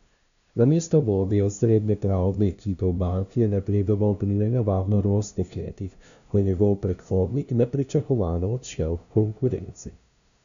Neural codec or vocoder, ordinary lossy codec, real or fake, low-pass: codec, 16 kHz, 0.5 kbps, FunCodec, trained on LibriTTS, 25 frames a second; none; fake; 7.2 kHz